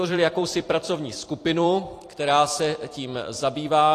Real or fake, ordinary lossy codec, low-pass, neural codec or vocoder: fake; AAC, 48 kbps; 14.4 kHz; vocoder, 44.1 kHz, 128 mel bands every 256 samples, BigVGAN v2